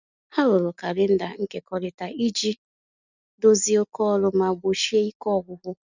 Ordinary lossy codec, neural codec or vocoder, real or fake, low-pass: none; none; real; 7.2 kHz